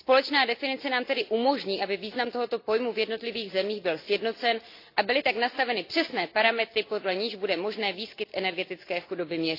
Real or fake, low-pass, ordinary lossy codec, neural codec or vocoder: real; 5.4 kHz; AAC, 32 kbps; none